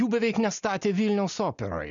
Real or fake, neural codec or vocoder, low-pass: real; none; 7.2 kHz